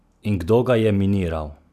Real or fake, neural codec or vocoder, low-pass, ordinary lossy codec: real; none; 14.4 kHz; none